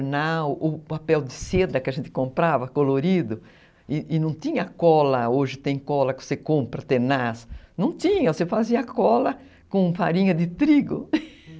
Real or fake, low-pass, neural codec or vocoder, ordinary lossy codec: real; none; none; none